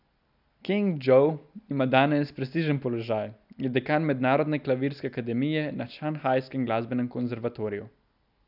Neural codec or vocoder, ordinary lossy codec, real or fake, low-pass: none; none; real; 5.4 kHz